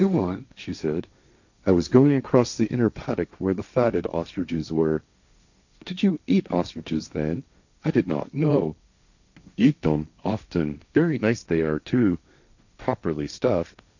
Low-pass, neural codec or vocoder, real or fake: 7.2 kHz; codec, 16 kHz, 1.1 kbps, Voila-Tokenizer; fake